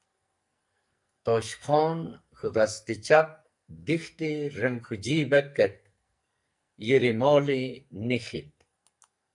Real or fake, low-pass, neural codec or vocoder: fake; 10.8 kHz; codec, 44.1 kHz, 2.6 kbps, SNAC